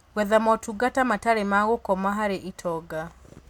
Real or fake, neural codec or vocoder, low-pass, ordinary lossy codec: real; none; 19.8 kHz; MP3, 96 kbps